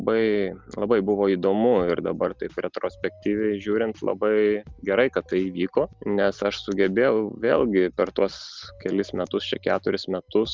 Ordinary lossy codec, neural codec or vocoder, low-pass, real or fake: Opus, 32 kbps; none; 7.2 kHz; real